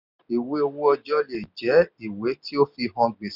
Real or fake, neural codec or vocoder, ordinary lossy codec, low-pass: real; none; none; 5.4 kHz